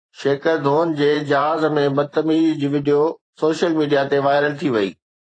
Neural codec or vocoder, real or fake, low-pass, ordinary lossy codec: vocoder, 44.1 kHz, 128 mel bands every 512 samples, BigVGAN v2; fake; 9.9 kHz; AAC, 32 kbps